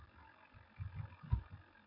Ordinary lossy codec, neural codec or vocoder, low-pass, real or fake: AAC, 24 kbps; none; 5.4 kHz; real